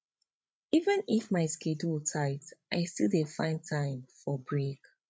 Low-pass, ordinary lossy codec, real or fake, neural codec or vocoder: none; none; fake; codec, 16 kHz, 16 kbps, FreqCodec, larger model